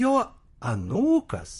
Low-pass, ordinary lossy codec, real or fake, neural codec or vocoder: 14.4 kHz; MP3, 48 kbps; fake; vocoder, 44.1 kHz, 128 mel bands, Pupu-Vocoder